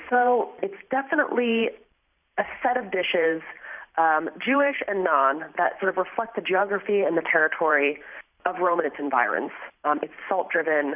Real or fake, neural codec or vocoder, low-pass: fake; vocoder, 44.1 kHz, 128 mel bands every 512 samples, BigVGAN v2; 3.6 kHz